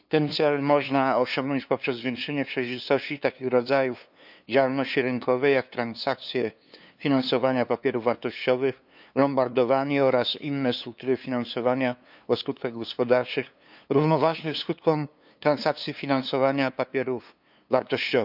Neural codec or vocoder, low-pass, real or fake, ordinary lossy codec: codec, 16 kHz, 2 kbps, FunCodec, trained on LibriTTS, 25 frames a second; 5.4 kHz; fake; none